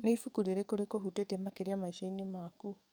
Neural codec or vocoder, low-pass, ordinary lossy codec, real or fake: codec, 44.1 kHz, 7.8 kbps, DAC; none; none; fake